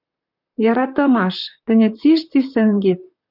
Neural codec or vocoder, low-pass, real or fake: vocoder, 44.1 kHz, 128 mel bands, Pupu-Vocoder; 5.4 kHz; fake